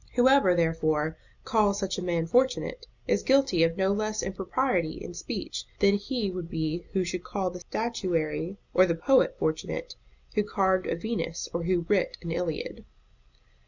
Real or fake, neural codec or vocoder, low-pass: real; none; 7.2 kHz